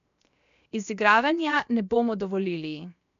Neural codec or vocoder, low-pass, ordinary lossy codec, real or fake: codec, 16 kHz, 0.7 kbps, FocalCodec; 7.2 kHz; AAC, 96 kbps; fake